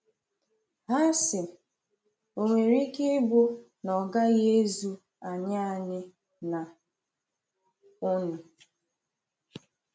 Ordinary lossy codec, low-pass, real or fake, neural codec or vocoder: none; none; real; none